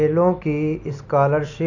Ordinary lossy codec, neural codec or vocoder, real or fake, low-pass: none; vocoder, 44.1 kHz, 128 mel bands every 256 samples, BigVGAN v2; fake; 7.2 kHz